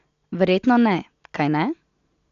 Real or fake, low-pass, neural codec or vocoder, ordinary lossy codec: real; 7.2 kHz; none; AAC, 64 kbps